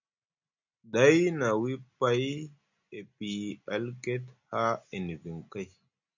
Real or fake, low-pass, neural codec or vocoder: real; 7.2 kHz; none